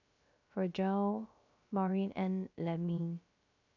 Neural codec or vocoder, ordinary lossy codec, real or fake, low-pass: codec, 16 kHz, 0.3 kbps, FocalCodec; none; fake; 7.2 kHz